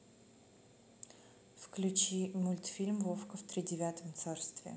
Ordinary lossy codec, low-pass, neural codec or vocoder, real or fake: none; none; none; real